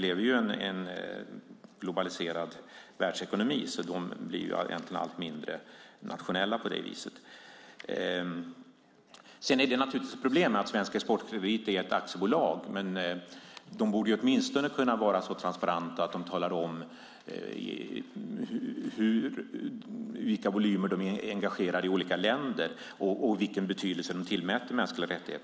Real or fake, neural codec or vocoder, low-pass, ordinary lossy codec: real; none; none; none